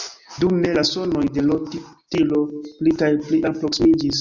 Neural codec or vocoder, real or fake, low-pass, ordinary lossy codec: none; real; 7.2 kHz; Opus, 64 kbps